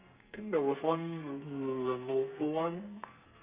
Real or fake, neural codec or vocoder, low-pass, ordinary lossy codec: fake; codec, 32 kHz, 1.9 kbps, SNAC; 3.6 kHz; Opus, 64 kbps